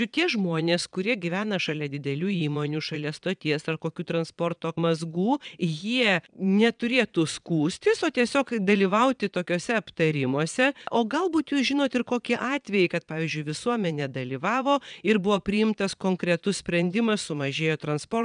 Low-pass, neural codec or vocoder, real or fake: 9.9 kHz; vocoder, 22.05 kHz, 80 mel bands, Vocos; fake